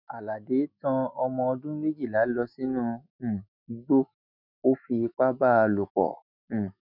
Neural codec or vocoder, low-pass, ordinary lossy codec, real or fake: autoencoder, 48 kHz, 128 numbers a frame, DAC-VAE, trained on Japanese speech; 5.4 kHz; none; fake